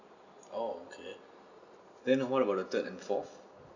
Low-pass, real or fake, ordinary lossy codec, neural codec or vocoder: 7.2 kHz; real; none; none